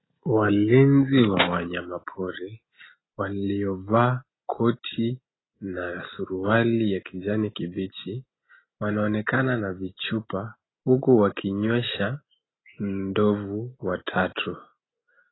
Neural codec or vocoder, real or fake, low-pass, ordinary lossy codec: none; real; 7.2 kHz; AAC, 16 kbps